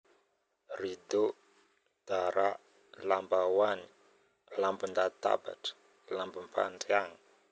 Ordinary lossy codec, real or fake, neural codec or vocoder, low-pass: none; real; none; none